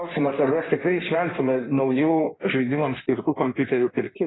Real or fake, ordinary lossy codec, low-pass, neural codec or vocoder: fake; AAC, 16 kbps; 7.2 kHz; codec, 16 kHz in and 24 kHz out, 1.1 kbps, FireRedTTS-2 codec